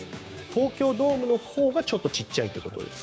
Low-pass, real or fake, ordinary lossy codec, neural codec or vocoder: none; fake; none; codec, 16 kHz, 6 kbps, DAC